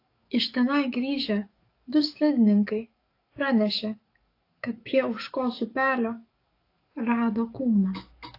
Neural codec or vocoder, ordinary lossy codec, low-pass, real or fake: codec, 44.1 kHz, 7.8 kbps, DAC; AAC, 32 kbps; 5.4 kHz; fake